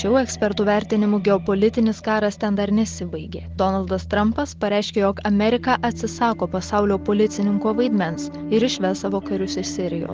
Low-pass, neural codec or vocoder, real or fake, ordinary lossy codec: 7.2 kHz; none; real; Opus, 16 kbps